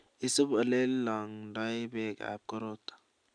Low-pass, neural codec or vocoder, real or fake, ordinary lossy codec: 9.9 kHz; none; real; none